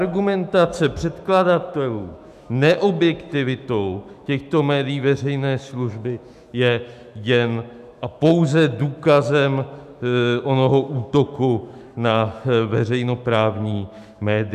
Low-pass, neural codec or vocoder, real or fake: 14.4 kHz; autoencoder, 48 kHz, 128 numbers a frame, DAC-VAE, trained on Japanese speech; fake